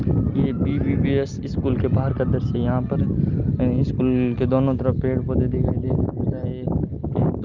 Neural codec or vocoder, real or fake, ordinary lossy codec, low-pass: none; real; Opus, 24 kbps; 7.2 kHz